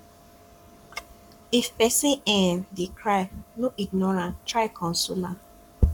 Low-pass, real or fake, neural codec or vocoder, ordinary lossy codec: 19.8 kHz; fake; codec, 44.1 kHz, 7.8 kbps, Pupu-Codec; none